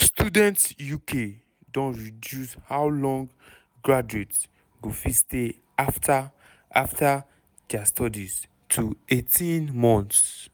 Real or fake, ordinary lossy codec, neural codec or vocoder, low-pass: real; none; none; none